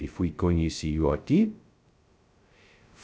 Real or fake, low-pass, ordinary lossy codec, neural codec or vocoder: fake; none; none; codec, 16 kHz, 0.2 kbps, FocalCodec